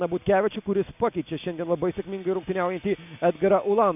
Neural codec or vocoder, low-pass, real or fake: none; 3.6 kHz; real